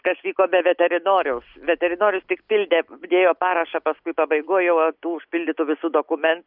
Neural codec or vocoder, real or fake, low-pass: none; real; 5.4 kHz